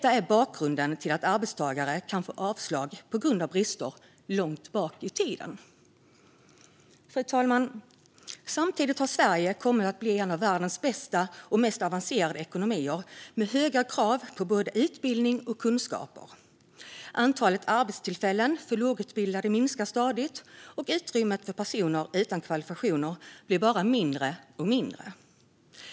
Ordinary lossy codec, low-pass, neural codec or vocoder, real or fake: none; none; none; real